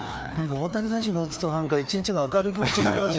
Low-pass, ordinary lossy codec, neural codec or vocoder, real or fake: none; none; codec, 16 kHz, 2 kbps, FreqCodec, larger model; fake